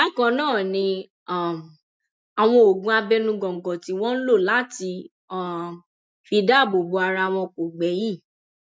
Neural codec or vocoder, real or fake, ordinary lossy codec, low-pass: none; real; none; none